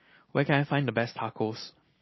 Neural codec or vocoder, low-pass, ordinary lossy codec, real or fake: none; 7.2 kHz; MP3, 24 kbps; real